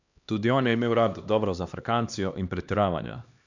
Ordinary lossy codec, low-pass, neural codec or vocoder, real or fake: none; 7.2 kHz; codec, 16 kHz, 2 kbps, X-Codec, HuBERT features, trained on LibriSpeech; fake